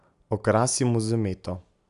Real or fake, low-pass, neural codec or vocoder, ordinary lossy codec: real; 10.8 kHz; none; none